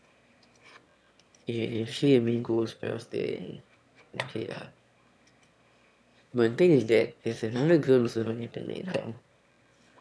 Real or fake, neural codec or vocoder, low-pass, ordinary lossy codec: fake; autoencoder, 22.05 kHz, a latent of 192 numbers a frame, VITS, trained on one speaker; none; none